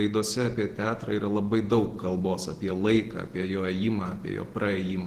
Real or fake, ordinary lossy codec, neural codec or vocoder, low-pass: fake; Opus, 16 kbps; vocoder, 48 kHz, 128 mel bands, Vocos; 14.4 kHz